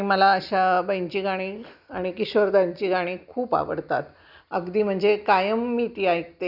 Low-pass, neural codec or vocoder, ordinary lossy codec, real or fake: 5.4 kHz; none; none; real